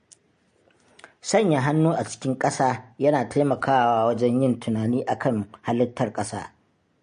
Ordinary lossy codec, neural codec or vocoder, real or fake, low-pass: MP3, 48 kbps; none; real; 9.9 kHz